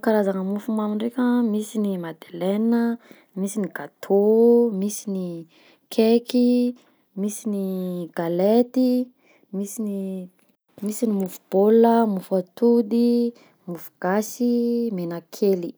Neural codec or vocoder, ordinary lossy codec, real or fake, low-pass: none; none; real; none